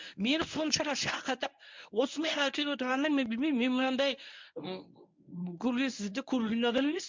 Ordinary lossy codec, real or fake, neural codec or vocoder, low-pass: MP3, 64 kbps; fake; codec, 24 kHz, 0.9 kbps, WavTokenizer, medium speech release version 1; 7.2 kHz